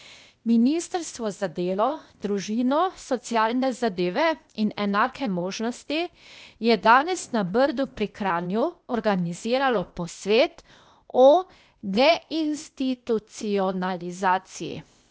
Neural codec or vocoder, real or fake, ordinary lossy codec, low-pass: codec, 16 kHz, 0.8 kbps, ZipCodec; fake; none; none